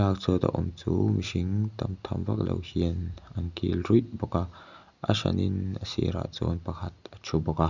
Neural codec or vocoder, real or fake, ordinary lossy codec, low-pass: none; real; none; 7.2 kHz